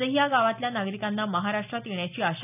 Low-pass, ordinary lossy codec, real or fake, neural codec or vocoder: 3.6 kHz; none; real; none